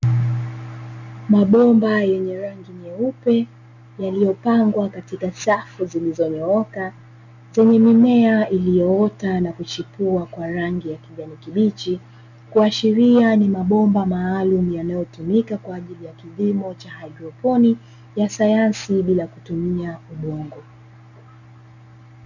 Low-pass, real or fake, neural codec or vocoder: 7.2 kHz; real; none